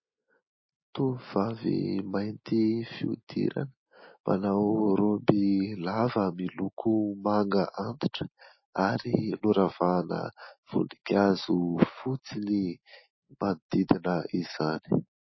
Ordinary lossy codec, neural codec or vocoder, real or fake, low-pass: MP3, 24 kbps; none; real; 7.2 kHz